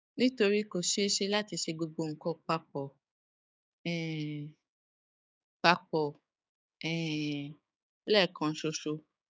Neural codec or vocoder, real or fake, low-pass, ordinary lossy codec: codec, 16 kHz, 6 kbps, DAC; fake; none; none